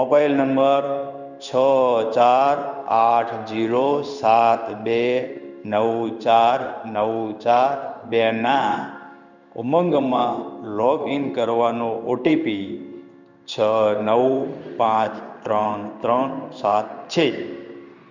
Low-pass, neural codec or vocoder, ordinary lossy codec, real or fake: 7.2 kHz; codec, 16 kHz in and 24 kHz out, 1 kbps, XY-Tokenizer; none; fake